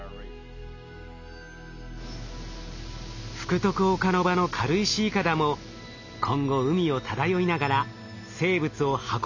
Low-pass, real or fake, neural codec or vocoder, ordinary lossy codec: 7.2 kHz; real; none; none